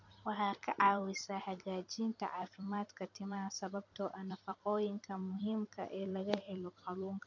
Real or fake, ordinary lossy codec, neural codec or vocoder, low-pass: fake; none; vocoder, 44.1 kHz, 128 mel bands every 256 samples, BigVGAN v2; 7.2 kHz